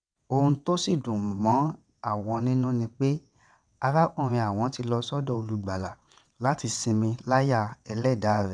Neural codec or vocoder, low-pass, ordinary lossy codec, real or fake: vocoder, 22.05 kHz, 80 mel bands, WaveNeXt; 9.9 kHz; none; fake